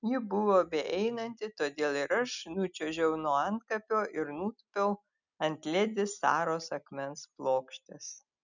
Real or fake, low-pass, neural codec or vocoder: real; 7.2 kHz; none